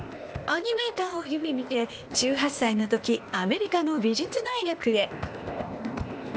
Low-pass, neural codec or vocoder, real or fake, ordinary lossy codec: none; codec, 16 kHz, 0.8 kbps, ZipCodec; fake; none